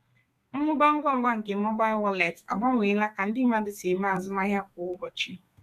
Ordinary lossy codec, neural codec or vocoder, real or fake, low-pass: none; codec, 32 kHz, 1.9 kbps, SNAC; fake; 14.4 kHz